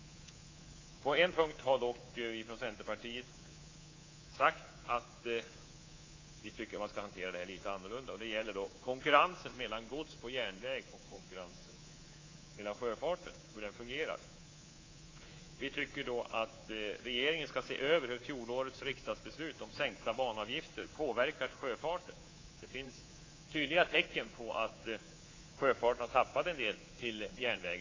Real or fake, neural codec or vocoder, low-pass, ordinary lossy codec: fake; codec, 24 kHz, 3.1 kbps, DualCodec; 7.2 kHz; AAC, 32 kbps